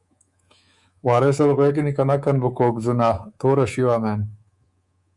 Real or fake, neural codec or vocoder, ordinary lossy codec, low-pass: fake; codec, 24 kHz, 3.1 kbps, DualCodec; Opus, 64 kbps; 10.8 kHz